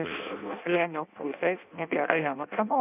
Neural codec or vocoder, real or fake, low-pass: codec, 16 kHz in and 24 kHz out, 0.6 kbps, FireRedTTS-2 codec; fake; 3.6 kHz